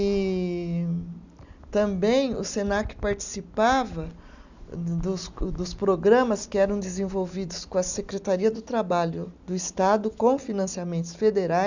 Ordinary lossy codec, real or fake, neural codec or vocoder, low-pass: none; real; none; 7.2 kHz